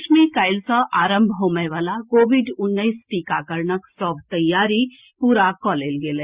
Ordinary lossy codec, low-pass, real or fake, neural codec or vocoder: Opus, 64 kbps; 3.6 kHz; real; none